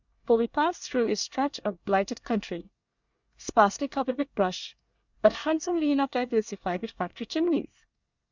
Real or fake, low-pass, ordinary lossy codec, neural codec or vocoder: fake; 7.2 kHz; Opus, 64 kbps; codec, 24 kHz, 1 kbps, SNAC